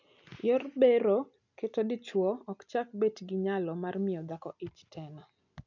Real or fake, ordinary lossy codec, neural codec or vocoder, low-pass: real; none; none; 7.2 kHz